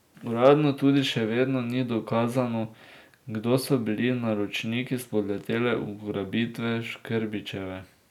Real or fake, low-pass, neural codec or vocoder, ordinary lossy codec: real; 19.8 kHz; none; none